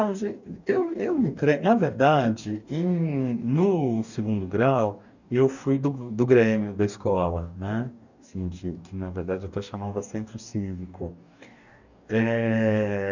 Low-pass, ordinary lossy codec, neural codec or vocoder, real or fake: 7.2 kHz; none; codec, 44.1 kHz, 2.6 kbps, DAC; fake